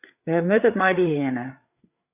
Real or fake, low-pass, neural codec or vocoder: fake; 3.6 kHz; codec, 16 kHz, 8 kbps, FreqCodec, larger model